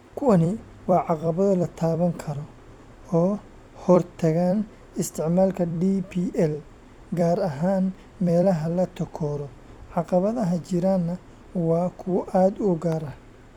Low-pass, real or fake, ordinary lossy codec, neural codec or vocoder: 19.8 kHz; fake; none; vocoder, 44.1 kHz, 128 mel bands every 256 samples, BigVGAN v2